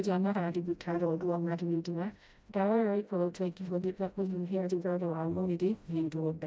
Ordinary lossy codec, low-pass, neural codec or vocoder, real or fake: none; none; codec, 16 kHz, 0.5 kbps, FreqCodec, smaller model; fake